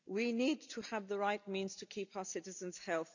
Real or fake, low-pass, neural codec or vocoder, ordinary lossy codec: real; 7.2 kHz; none; none